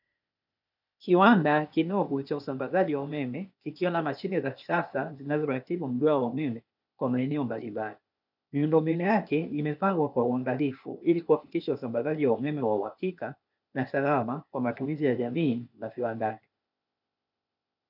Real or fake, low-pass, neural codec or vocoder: fake; 5.4 kHz; codec, 16 kHz, 0.8 kbps, ZipCodec